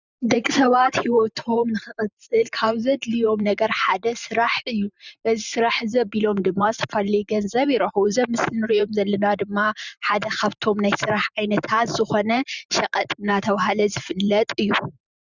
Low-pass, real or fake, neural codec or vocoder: 7.2 kHz; fake; vocoder, 44.1 kHz, 128 mel bands every 512 samples, BigVGAN v2